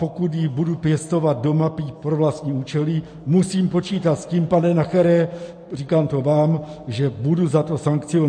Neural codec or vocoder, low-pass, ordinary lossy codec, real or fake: none; 9.9 kHz; MP3, 48 kbps; real